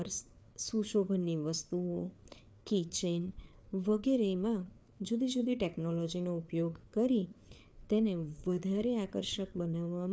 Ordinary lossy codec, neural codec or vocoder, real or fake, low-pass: none; codec, 16 kHz, 4 kbps, FreqCodec, larger model; fake; none